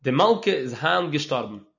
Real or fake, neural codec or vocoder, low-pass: real; none; 7.2 kHz